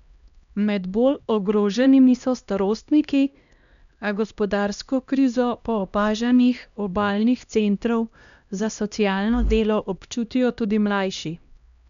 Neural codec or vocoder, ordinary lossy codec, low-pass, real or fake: codec, 16 kHz, 1 kbps, X-Codec, HuBERT features, trained on LibriSpeech; none; 7.2 kHz; fake